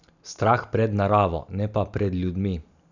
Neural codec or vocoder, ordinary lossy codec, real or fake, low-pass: none; none; real; 7.2 kHz